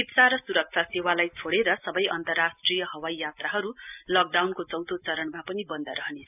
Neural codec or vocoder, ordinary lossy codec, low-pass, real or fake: none; none; 3.6 kHz; real